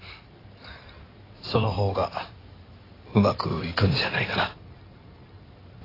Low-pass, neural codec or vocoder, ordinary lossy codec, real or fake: 5.4 kHz; codec, 16 kHz in and 24 kHz out, 2.2 kbps, FireRedTTS-2 codec; AAC, 24 kbps; fake